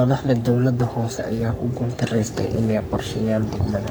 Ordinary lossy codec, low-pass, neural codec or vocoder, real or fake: none; none; codec, 44.1 kHz, 3.4 kbps, Pupu-Codec; fake